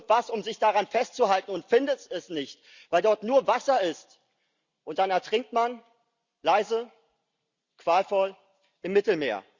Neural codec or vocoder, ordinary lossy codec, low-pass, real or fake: none; Opus, 64 kbps; 7.2 kHz; real